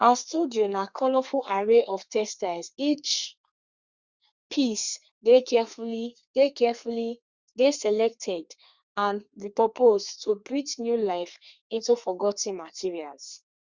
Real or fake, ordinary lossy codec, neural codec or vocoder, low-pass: fake; Opus, 64 kbps; codec, 32 kHz, 1.9 kbps, SNAC; 7.2 kHz